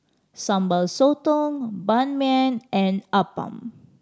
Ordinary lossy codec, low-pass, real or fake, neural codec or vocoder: none; none; real; none